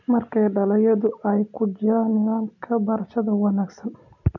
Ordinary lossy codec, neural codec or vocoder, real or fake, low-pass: none; none; real; 7.2 kHz